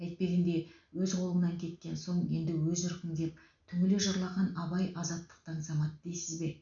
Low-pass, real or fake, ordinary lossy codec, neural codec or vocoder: 7.2 kHz; real; none; none